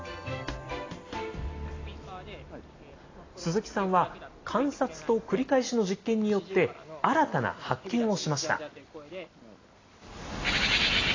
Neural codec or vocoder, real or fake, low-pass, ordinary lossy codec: none; real; 7.2 kHz; AAC, 32 kbps